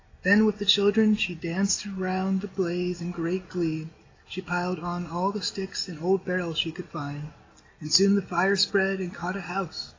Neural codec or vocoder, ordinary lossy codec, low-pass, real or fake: none; AAC, 32 kbps; 7.2 kHz; real